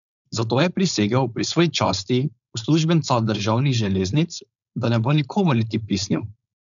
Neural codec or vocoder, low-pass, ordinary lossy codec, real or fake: codec, 16 kHz, 4.8 kbps, FACodec; 7.2 kHz; none; fake